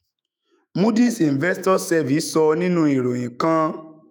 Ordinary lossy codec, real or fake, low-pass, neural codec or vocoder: none; fake; none; autoencoder, 48 kHz, 128 numbers a frame, DAC-VAE, trained on Japanese speech